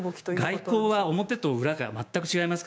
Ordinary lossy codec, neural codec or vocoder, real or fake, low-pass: none; codec, 16 kHz, 6 kbps, DAC; fake; none